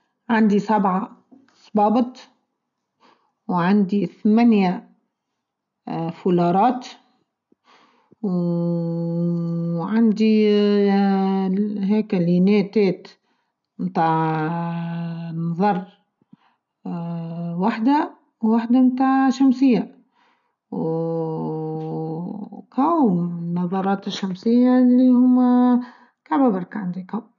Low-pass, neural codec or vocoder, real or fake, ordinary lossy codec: 7.2 kHz; none; real; none